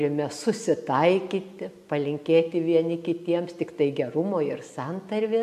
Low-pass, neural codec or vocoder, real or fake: 14.4 kHz; none; real